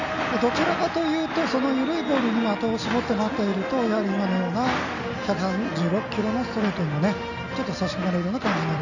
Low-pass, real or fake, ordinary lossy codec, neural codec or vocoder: 7.2 kHz; real; none; none